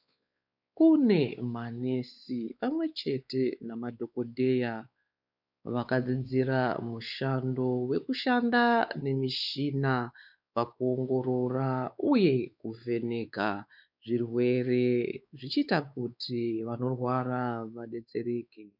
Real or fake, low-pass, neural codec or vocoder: fake; 5.4 kHz; codec, 16 kHz, 4 kbps, X-Codec, WavLM features, trained on Multilingual LibriSpeech